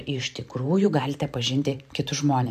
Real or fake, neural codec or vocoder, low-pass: fake; vocoder, 44.1 kHz, 128 mel bands every 512 samples, BigVGAN v2; 14.4 kHz